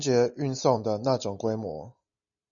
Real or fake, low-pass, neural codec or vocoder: real; 7.2 kHz; none